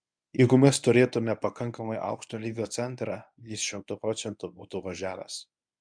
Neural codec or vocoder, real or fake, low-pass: codec, 24 kHz, 0.9 kbps, WavTokenizer, medium speech release version 1; fake; 9.9 kHz